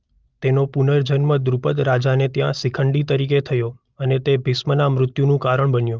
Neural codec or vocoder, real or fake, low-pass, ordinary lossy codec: none; real; 7.2 kHz; Opus, 32 kbps